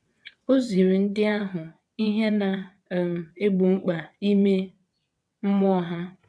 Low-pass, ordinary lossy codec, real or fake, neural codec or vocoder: none; none; fake; vocoder, 22.05 kHz, 80 mel bands, WaveNeXt